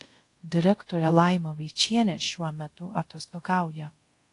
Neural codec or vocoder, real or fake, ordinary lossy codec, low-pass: codec, 24 kHz, 0.5 kbps, DualCodec; fake; AAC, 48 kbps; 10.8 kHz